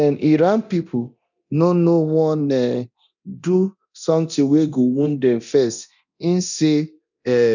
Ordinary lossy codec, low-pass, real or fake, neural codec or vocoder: none; 7.2 kHz; fake; codec, 24 kHz, 0.9 kbps, DualCodec